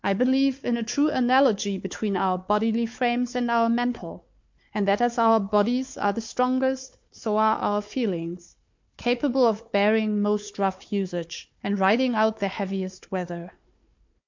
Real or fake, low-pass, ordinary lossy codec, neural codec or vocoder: fake; 7.2 kHz; MP3, 48 kbps; codec, 16 kHz, 4 kbps, FunCodec, trained on Chinese and English, 50 frames a second